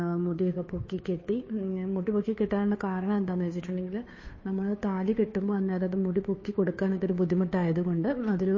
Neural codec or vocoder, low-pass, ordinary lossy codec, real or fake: codec, 16 kHz, 2 kbps, FunCodec, trained on Chinese and English, 25 frames a second; 7.2 kHz; MP3, 32 kbps; fake